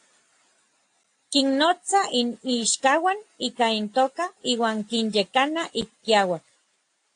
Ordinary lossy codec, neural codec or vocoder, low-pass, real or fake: AAC, 48 kbps; none; 9.9 kHz; real